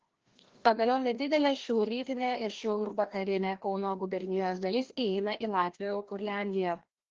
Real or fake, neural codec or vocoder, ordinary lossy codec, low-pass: fake; codec, 16 kHz, 1 kbps, FreqCodec, larger model; Opus, 16 kbps; 7.2 kHz